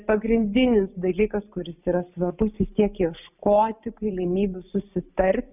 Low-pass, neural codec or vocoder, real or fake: 3.6 kHz; none; real